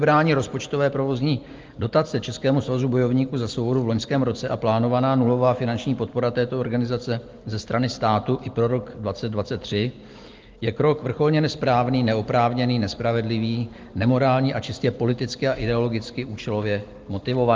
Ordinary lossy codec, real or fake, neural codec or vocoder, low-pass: Opus, 32 kbps; real; none; 7.2 kHz